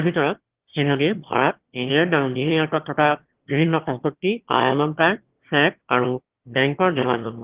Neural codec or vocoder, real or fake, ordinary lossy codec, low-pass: autoencoder, 22.05 kHz, a latent of 192 numbers a frame, VITS, trained on one speaker; fake; Opus, 16 kbps; 3.6 kHz